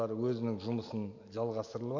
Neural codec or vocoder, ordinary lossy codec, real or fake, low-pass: none; none; real; 7.2 kHz